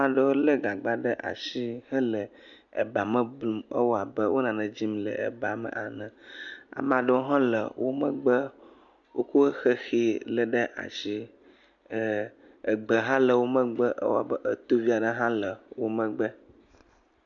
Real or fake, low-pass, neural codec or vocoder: real; 7.2 kHz; none